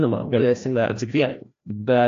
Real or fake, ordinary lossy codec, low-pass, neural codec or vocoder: fake; AAC, 48 kbps; 7.2 kHz; codec, 16 kHz, 1 kbps, FreqCodec, larger model